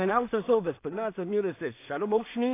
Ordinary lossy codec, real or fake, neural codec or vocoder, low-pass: AAC, 24 kbps; fake; codec, 16 kHz in and 24 kHz out, 0.4 kbps, LongCat-Audio-Codec, two codebook decoder; 3.6 kHz